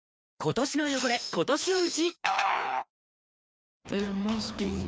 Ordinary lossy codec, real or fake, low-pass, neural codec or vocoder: none; fake; none; codec, 16 kHz, 2 kbps, FreqCodec, larger model